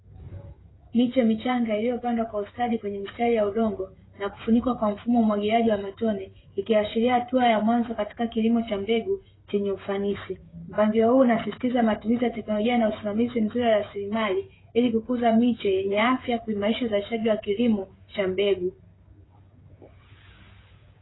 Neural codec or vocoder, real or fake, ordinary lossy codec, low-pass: codec, 16 kHz, 16 kbps, FreqCodec, smaller model; fake; AAC, 16 kbps; 7.2 kHz